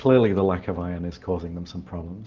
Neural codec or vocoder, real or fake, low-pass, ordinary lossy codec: none; real; 7.2 kHz; Opus, 32 kbps